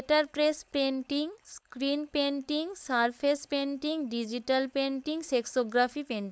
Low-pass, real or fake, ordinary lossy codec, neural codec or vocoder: none; fake; none; codec, 16 kHz, 4.8 kbps, FACodec